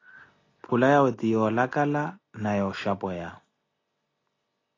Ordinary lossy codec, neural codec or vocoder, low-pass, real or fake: AAC, 32 kbps; none; 7.2 kHz; real